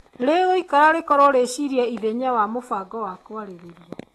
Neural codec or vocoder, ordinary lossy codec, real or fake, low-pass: autoencoder, 48 kHz, 128 numbers a frame, DAC-VAE, trained on Japanese speech; AAC, 32 kbps; fake; 19.8 kHz